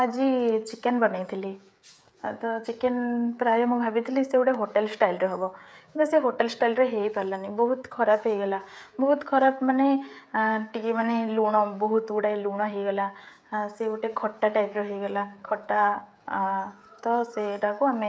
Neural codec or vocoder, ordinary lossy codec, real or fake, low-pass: codec, 16 kHz, 16 kbps, FreqCodec, smaller model; none; fake; none